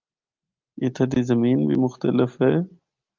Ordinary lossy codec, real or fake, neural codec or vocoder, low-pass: Opus, 32 kbps; real; none; 7.2 kHz